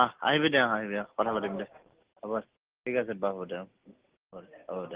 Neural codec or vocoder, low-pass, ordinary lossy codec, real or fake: none; 3.6 kHz; Opus, 16 kbps; real